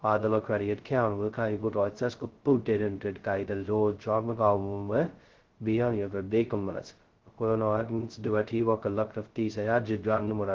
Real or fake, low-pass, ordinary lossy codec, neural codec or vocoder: fake; 7.2 kHz; Opus, 16 kbps; codec, 16 kHz, 0.2 kbps, FocalCodec